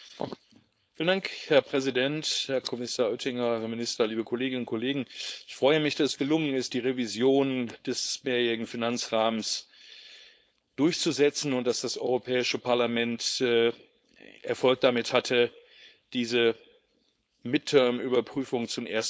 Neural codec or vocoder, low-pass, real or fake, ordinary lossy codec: codec, 16 kHz, 4.8 kbps, FACodec; none; fake; none